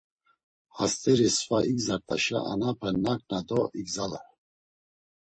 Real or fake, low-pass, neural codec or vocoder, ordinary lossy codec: fake; 9.9 kHz; vocoder, 44.1 kHz, 128 mel bands every 256 samples, BigVGAN v2; MP3, 32 kbps